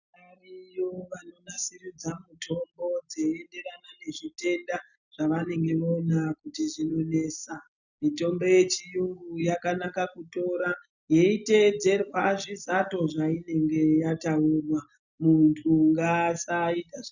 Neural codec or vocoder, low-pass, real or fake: none; 7.2 kHz; real